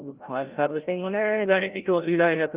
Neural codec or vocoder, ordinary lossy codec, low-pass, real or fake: codec, 16 kHz, 0.5 kbps, FreqCodec, larger model; Opus, 32 kbps; 3.6 kHz; fake